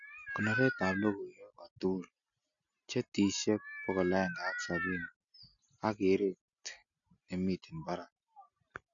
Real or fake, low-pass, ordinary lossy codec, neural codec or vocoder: real; 7.2 kHz; none; none